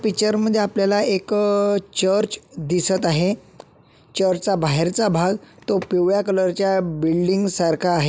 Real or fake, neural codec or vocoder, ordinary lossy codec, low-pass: real; none; none; none